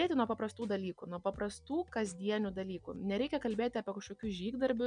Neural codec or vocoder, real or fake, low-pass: none; real; 9.9 kHz